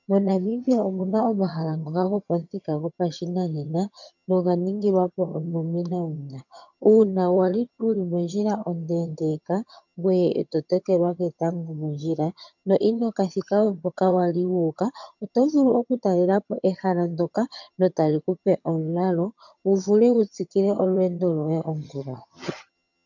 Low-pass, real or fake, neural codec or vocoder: 7.2 kHz; fake; vocoder, 22.05 kHz, 80 mel bands, HiFi-GAN